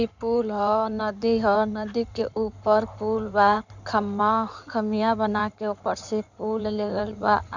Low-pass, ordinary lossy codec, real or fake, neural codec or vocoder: 7.2 kHz; none; fake; codec, 16 kHz in and 24 kHz out, 2.2 kbps, FireRedTTS-2 codec